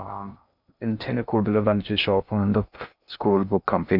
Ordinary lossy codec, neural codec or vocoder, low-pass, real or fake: none; codec, 16 kHz in and 24 kHz out, 0.6 kbps, FocalCodec, streaming, 2048 codes; 5.4 kHz; fake